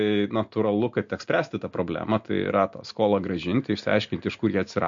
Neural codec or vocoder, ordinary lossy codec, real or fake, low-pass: none; MP3, 48 kbps; real; 7.2 kHz